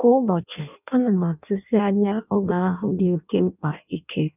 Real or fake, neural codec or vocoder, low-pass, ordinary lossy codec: fake; codec, 16 kHz in and 24 kHz out, 0.6 kbps, FireRedTTS-2 codec; 3.6 kHz; none